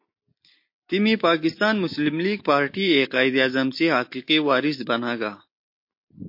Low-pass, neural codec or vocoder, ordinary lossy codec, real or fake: 5.4 kHz; none; MP3, 32 kbps; real